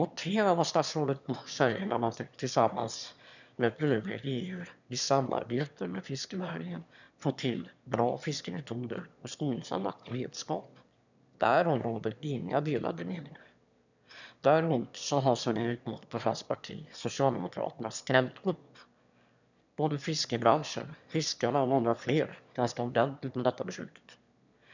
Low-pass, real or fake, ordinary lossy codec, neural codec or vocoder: 7.2 kHz; fake; none; autoencoder, 22.05 kHz, a latent of 192 numbers a frame, VITS, trained on one speaker